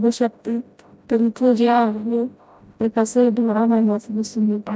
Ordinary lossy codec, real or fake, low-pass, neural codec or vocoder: none; fake; none; codec, 16 kHz, 0.5 kbps, FreqCodec, smaller model